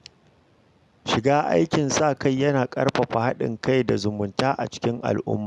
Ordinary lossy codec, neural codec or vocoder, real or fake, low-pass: none; none; real; none